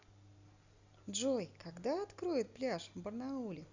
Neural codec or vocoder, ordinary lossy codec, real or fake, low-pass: none; Opus, 64 kbps; real; 7.2 kHz